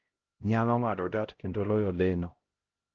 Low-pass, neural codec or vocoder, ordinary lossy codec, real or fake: 7.2 kHz; codec, 16 kHz, 0.5 kbps, X-Codec, HuBERT features, trained on LibriSpeech; Opus, 16 kbps; fake